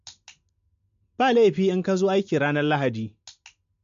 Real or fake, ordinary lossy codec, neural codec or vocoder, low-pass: real; MP3, 48 kbps; none; 7.2 kHz